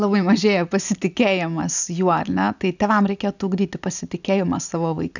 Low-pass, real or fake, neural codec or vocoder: 7.2 kHz; real; none